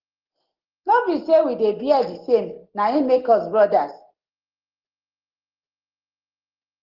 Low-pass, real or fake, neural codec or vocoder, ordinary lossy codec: 5.4 kHz; real; none; Opus, 16 kbps